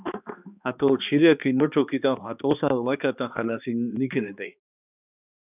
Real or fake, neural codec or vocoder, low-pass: fake; codec, 16 kHz, 2 kbps, X-Codec, HuBERT features, trained on balanced general audio; 3.6 kHz